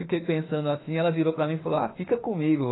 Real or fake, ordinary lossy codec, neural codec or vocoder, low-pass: fake; AAC, 16 kbps; autoencoder, 48 kHz, 32 numbers a frame, DAC-VAE, trained on Japanese speech; 7.2 kHz